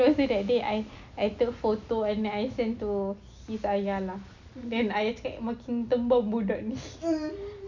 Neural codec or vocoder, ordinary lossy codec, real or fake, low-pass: none; none; real; 7.2 kHz